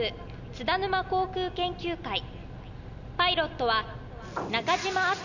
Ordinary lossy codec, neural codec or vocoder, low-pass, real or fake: none; none; 7.2 kHz; real